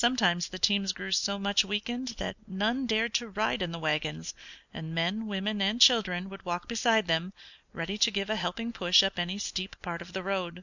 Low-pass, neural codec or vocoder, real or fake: 7.2 kHz; none; real